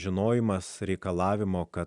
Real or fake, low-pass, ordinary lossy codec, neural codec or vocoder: real; 10.8 kHz; Opus, 64 kbps; none